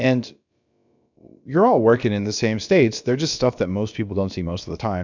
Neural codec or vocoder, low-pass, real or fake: codec, 16 kHz, about 1 kbps, DyCAST, with the encoder's durations; 7.2 kHz; fake